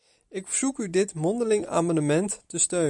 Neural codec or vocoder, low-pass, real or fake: none; 10.8 kHz; real